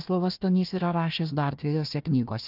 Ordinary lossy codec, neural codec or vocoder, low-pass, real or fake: Opus, 32 kbps; codec, 16 kHz, 1 kbps, FunCodec, trained on Chinese and English, 50 frames a second; 5.4 kHz; fake